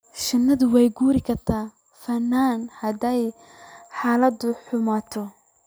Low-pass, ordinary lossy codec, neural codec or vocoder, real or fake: none; none; none; real